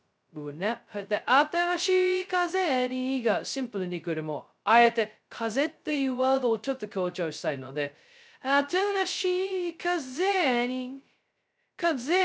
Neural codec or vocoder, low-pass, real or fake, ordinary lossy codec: codec, 16 kHz, 0.2 kbps, FocalCodec; none; fake; none